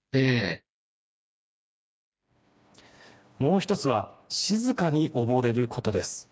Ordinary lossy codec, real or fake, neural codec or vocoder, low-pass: none; fake; codec, 16 kHz, 2 kbps, FreqCodec, smaller model; none